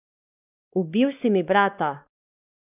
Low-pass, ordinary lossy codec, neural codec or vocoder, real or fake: 3.6 kHz; none; codec, 16 kHz, 2 kbps, X-Codec, WavLM features, trained on Multilingual LibriSpeech; fake